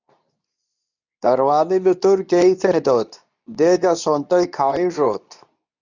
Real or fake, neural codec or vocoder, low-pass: fake; codec, 24 kHz, 0.9 kbps, WavTokenizer, medium speech release version 2; 7.2 kHz